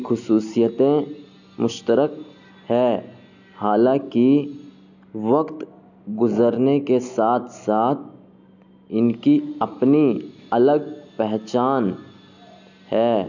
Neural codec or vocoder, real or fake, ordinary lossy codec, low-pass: none; real; none; 7.2 kHz